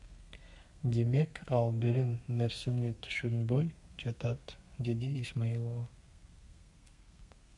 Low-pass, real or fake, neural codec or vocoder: 10.8 kHz; fake; codec, 32 kHz, 1.9 kbps, SNAC